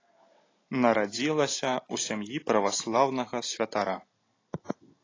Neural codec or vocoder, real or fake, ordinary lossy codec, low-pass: none; real; AAC, 32 kbps; 7.2 kHz